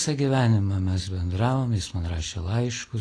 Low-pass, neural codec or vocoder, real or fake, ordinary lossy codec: 9.9 kHz; none; real; AAC, 32 kbps